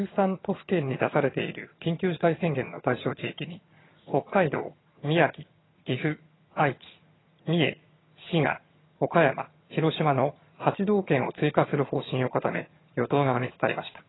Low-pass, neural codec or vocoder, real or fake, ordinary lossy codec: 7.2 kHz; vocoder, 22.05 kHz, 80 mel bands, HiFi-GAN; fake; AAC, 16 kbps